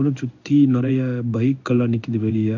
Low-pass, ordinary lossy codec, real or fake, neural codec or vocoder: 7.2 kHz; none; fake; codec, 16 kHz in and 24 kHz out, 1 kbps, XY-Tokenizer